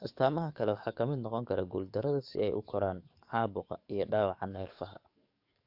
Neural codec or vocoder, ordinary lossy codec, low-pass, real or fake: codec, 44.1 kHz, 7.8 kbps, DAC; AAC, 48 kbps; 5.4 kHz; fake